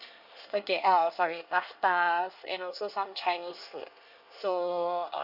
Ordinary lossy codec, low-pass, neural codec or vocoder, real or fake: none; 5.4 kHz; codec, 24 kHz, 1 kbps, SNAC; fake